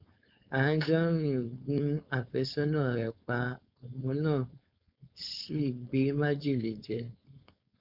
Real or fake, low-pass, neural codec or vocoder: fake; 5.4 kHz; codec, 16 kHz, 4.8 kbps, FACodec